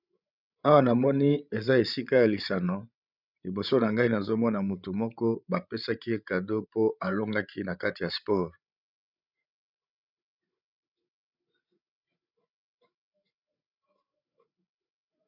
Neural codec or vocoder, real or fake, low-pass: codec, 16 kHz, 16 kbps, FreqCodec, larger model; fake; 5.4 kHz